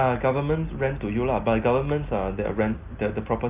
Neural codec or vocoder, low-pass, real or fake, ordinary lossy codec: none; 3.6 kHz; real; Opus, 24 kbps